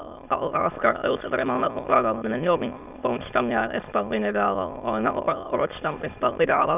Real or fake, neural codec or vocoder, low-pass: fake; autoencoder, 22.05 kHz, a latent of 192 numbers a frame, VITS, trained on many speakers; 3.6 kHz